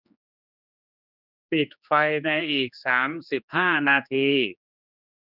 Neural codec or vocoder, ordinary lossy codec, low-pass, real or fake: codec, 16 kHz, 2 kbps, X-Codec, HuBERT features, trained on general audio; none; 5.4 kHz; fake